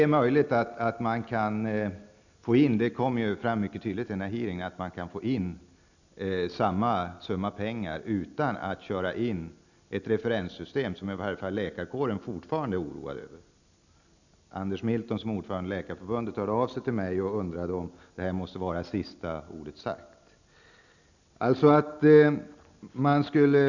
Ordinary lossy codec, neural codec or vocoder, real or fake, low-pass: none; none; real; 7.2 kHz